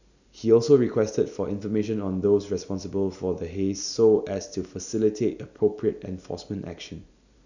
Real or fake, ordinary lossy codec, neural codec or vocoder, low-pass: real; none; none; 7.2 kHz